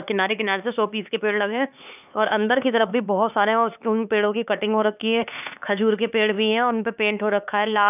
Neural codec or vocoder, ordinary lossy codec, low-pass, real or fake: codec, 16 kHz, 4 kbps, X-Codec, HuBERT features, trained on LibriSpeech; none; 3.6 kHz; fake